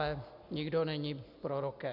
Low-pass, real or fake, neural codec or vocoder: 5.4 kHz; real; none